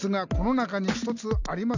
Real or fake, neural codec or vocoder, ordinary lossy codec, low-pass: real; none; none; 7.2 kHz